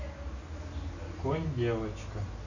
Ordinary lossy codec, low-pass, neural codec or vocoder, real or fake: none; 7.2 kHz; none; real